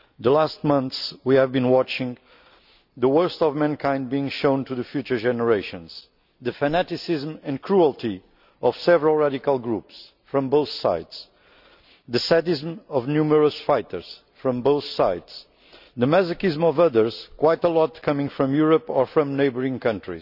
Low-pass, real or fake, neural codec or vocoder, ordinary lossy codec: 5.4 kHz; real; none; none